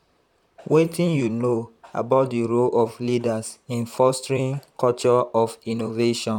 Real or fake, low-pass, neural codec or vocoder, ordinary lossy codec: fake; 19.8 kHz; vocoder, 44.1 kHz, 128 mel bands, Pupu-Vocoder; none